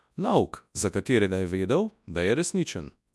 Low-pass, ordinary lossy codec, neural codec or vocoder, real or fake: none; none; codec, 24 kHz, 0.9 kbps, WavTokenizer, large speech release; fake